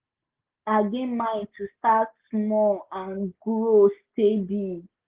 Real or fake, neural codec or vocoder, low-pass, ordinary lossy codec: real; none; 3.6 kHz; Opus, 16 kbps